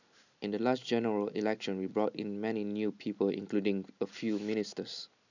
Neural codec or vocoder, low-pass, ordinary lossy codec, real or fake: none; 7.2 kHz; none; real